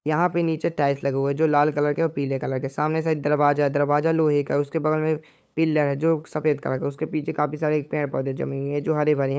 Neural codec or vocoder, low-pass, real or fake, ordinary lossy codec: codec, 16 kHz, 8 kbps, FunCodec, trained on LibriTTS, 25 frames a second; none; fake; none